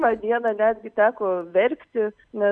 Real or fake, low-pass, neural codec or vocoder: real; 9.9 kHz; none